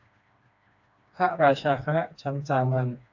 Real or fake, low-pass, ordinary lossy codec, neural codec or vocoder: fake; 7.2 kHz; AAC, 48 kbps; codec, 16 kHz, 2 kbps, FreqCodec, smaller model